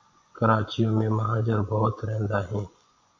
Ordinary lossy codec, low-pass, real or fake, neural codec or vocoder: MP3, 48 kbps; 7.2 kHz; fake; vocoder, 24 kHz, 100 mel bands, Vocos